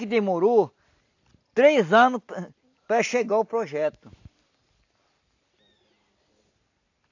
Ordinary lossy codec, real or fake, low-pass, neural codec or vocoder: AAC, 48 kbps; real; 7.2 kHz; none